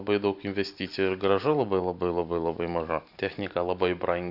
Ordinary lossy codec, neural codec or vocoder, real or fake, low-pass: Opus, 64 kbps; vocoder, 44.1 kHz, 128 mel bands every 512 samples, BigVGAN v2; fake; 5.4 kHz